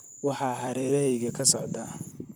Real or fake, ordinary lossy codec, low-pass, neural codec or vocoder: fake; none; none; vocoder, 44.1 kHz, 128 mel bands, Pupu-Vocoder